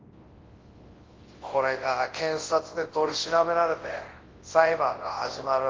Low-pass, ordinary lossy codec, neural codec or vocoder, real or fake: 7.2 kHz; Opus, 16 kbps; codec, 24 kHz, 0.9 kbps, WavTokenizer, large speech release; fake